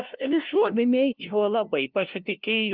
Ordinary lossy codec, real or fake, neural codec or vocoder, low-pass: Opus, 32 kbps; fake; codec, 16 kHz, 0.5 kbps, FunCodec, trained on LibriTTS, 25 frames a second; 5.4 kHz